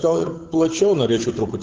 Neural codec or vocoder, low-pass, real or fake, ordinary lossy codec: codec, 16 kHz, 8 kbps, FunCodec, trained on Chinese and English, 25 frames a second; 7.2 kHz; fake; Opus, 16 kbps